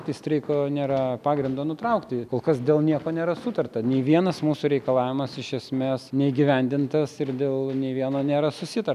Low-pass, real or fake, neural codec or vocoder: 14.4 kHz; real; none